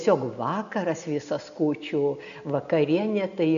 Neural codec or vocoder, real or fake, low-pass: none; real; 7.2 kHz